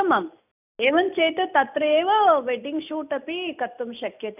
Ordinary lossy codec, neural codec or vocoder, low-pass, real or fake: none; none; 3.6 kHz; real